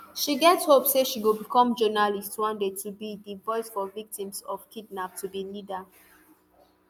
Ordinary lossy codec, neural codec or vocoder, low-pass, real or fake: none; none; none; real